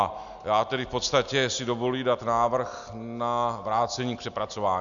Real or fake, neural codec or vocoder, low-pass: real; none; 7.2 kHz